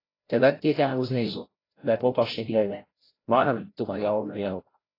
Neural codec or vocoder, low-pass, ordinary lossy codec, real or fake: codec, 16 kHz, 0.5 kbps, FreqCodec, larger model; 5.4 kHz; AAC, 24 kbps; fake